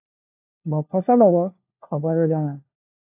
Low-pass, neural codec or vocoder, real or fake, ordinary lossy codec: 3.6 kHz; codec, 16 kHz, 1 kbps, FunCodec, trained on LibriTTS, 50 frames a second; fake; AAC, 24 kbps